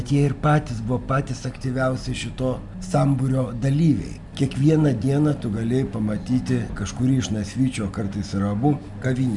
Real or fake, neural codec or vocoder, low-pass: real; none; 10.8 kHz